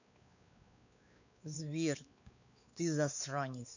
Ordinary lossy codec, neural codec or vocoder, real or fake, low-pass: none; codec, 16 kHz, 4 kbps, X-Codec, WavLM features, trained on Multilingual LibriSpeech; fake; 7.2 kHz